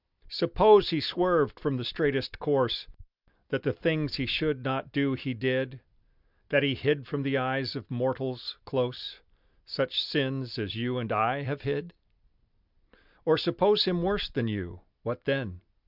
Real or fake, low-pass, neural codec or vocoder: real; 5.4 kHz; none